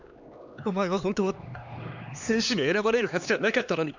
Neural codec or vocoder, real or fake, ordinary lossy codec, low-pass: codec, 16 kHz, 2 kbps, X-Codec, HuBERT features, trained on LibriSpeech; fake; none; 7.2 kHz